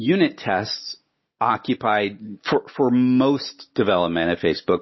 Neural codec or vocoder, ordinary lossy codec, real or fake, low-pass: none; MP3, 24 kbps; real; 7.2 kHz